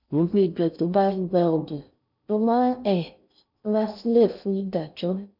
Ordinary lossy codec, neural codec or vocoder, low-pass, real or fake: none; codec, 16 kHz in and 24 kHz out, 0.6 kbps, FocalCodec, streaming, 4096 codes; 5.4 kHz; fake